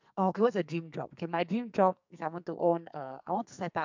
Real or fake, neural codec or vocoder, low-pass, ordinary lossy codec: fake; codec, 44.1 kHz, 2.6 kbps, SNAC; 7.2 kHz; MP3, 64 kbps